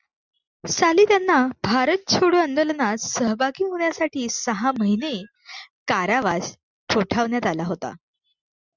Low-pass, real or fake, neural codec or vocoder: 7.2 kHz; real; none